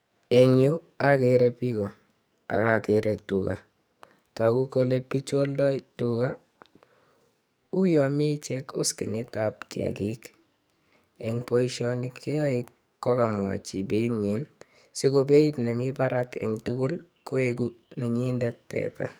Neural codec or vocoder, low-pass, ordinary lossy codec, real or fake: codec, 44.1 kHz, 2.6 kbps, SNAC; none; none; fake